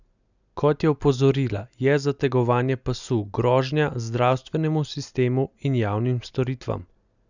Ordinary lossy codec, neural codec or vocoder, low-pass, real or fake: none; none; 7.2 kHz; real